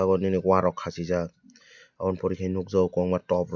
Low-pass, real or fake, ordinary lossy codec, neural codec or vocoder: 7.2 kHz; real; none; none